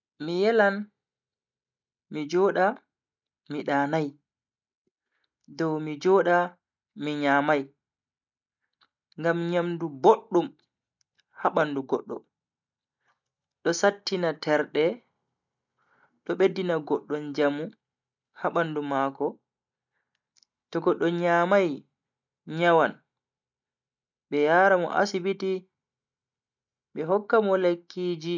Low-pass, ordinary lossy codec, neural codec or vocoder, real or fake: 7.2 kHz; none; none; real